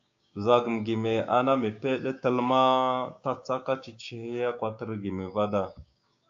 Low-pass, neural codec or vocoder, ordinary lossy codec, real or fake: 7.2 kHz; codec, 16 kHz, 6 kbps, DAC; AAC, 64 kbps; fake